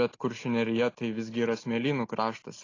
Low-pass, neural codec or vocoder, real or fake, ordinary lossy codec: 7.2 kHz; none; real; AAC, 32 kbps